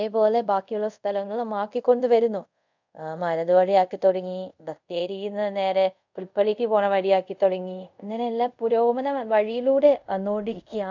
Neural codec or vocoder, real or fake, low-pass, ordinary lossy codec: codec, 24 kHz, 0.5 kbps, DualCodec; fake; 7.2 kHz; none